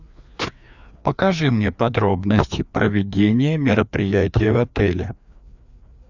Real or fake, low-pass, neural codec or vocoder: fake; 7.2 kHz; codec, 16 kHz, 2 kbps, FreqCodec, larger model